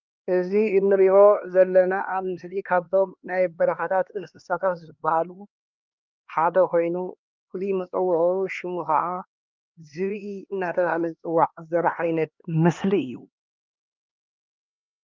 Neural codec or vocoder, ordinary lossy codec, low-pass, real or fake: codec, 16 kHz, 2 kbps, X-Codec, HuBERT features, trained on LibriSpeech; Opus, 24 kbps; 7.2 kHz; fake